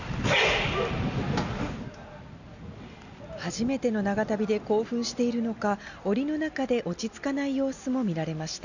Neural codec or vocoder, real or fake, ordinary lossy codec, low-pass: none; real; none; 7.2 kHz